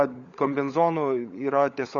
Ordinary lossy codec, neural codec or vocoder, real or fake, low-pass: Opus, 64 kbps; codec, 16 kHz, 16 kbps, FunCodec, trained on LibriTTS, 50 frames a second; fake; 7.2 kHz